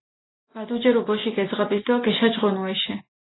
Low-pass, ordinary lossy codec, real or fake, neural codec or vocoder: 7.2 kHz; AAC, 16 kbps; real; none